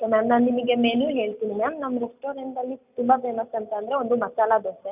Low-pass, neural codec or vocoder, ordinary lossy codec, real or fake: 3.6 kHz; none; none; real